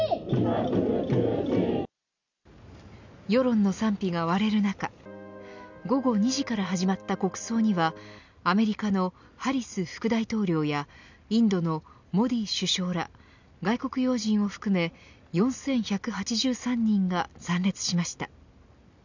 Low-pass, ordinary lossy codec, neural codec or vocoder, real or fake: 7.2 kHz; none; none; real